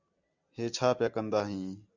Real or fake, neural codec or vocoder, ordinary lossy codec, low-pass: real; none; Opus, 64 kbps; 7.2 kHz